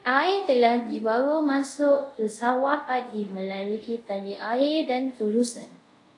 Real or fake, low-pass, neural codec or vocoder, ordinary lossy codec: fake; 10.8 kHz; codec, 24 kHz, 0.5 kbps, DualCodec; AAC, 48 kbps